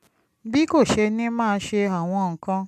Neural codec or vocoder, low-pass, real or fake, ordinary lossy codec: none; 14.4 kHz; real; none